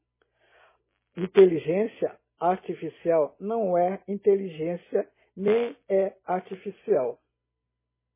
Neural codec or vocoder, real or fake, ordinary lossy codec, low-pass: none; real; MP3, 16 kbps; 3.6 kHz